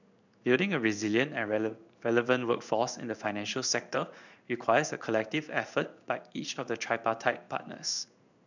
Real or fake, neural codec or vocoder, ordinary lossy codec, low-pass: fake; codec, 16 kHz in and 24 kHz out, 1 kbps, XY-Tokenizer; none; 7.2 kHz